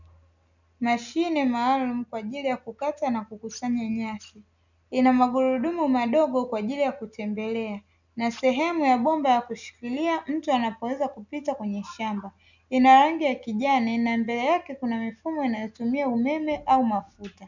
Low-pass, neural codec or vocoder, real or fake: 7.2 kHz; none; real